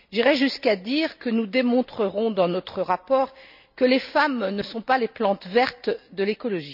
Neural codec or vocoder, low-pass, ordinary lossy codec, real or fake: none; 5.4 kHz; none; real